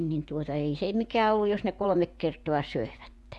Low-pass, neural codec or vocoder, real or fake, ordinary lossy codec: none; vocoder, 24 kHz, 100 mel bands, Vocos; fake; none